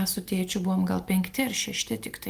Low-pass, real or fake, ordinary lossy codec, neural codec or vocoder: 14.4 kHz; real; Opus, 32 kbps; none